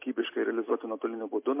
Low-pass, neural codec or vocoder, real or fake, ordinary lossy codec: 3.6 kHz; none; real; MP3, 24 kbps